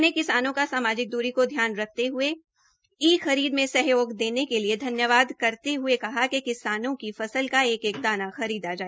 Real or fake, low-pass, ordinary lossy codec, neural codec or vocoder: real; none; none; none